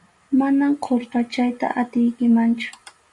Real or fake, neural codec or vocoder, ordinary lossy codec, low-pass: real; none; MP3, 96 kbps; 10.8 kHz